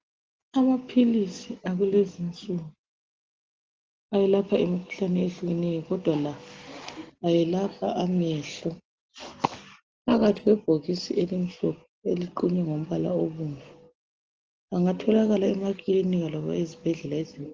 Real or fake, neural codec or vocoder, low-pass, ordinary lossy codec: real; none; 7.2 kHz; Opus, 16 kbps